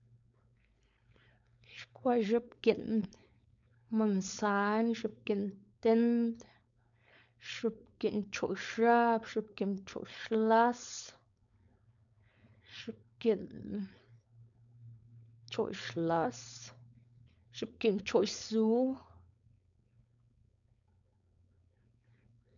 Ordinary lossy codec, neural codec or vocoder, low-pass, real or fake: none; codec, 16 kHz, 4.8 kbps, FACodec; 7.2 kHz; fake